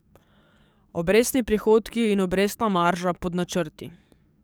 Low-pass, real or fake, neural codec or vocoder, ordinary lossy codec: none; fake; codec, 44.1 kHz, 7.8 kbps, DAC; none